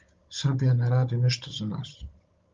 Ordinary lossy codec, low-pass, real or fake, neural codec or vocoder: Opus, 32 kbps; 7.2 kHz; fake; codec, 16 kHz, 16 kbps, FunCodec, trained on Chinese and English, 50 frames a second